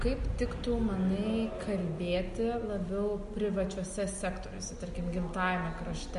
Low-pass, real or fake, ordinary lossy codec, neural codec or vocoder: 10.8 kHz; real; MP3, 48 kbps; none